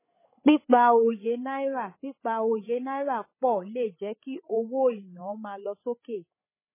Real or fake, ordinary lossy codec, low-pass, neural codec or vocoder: fake; MP3, 16 kbps; 3.6 kHz; codec, 16 kHz, 8 kbps, FreqCodec, larger model